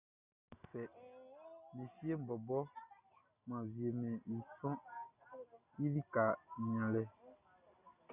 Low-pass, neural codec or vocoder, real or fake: 3.6 kHz; none; real